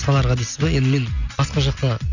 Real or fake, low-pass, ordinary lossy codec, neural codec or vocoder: real; 7.2 kHz; none; none